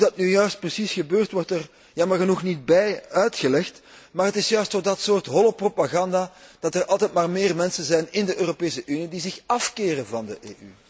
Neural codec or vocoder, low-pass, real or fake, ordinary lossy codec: none; none; real; none